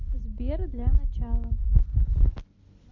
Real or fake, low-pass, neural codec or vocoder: real; 7.2 kHz; none